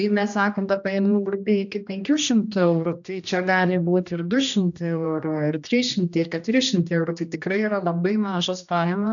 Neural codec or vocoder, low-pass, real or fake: codec, 16 kHz, 1 kbps, X-Codec, HuBERT features, trained on general audio; 7.2 kHz; fake